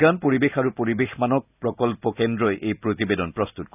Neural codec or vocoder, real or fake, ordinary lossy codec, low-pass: none; real; none; 3.6 kHz